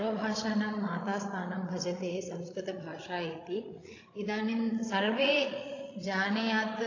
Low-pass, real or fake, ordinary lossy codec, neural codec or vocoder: 7.2 kHz; fake; AAC, 32 kbps; codec, 16 kHz, 16 kbps, FreqCodec, larger model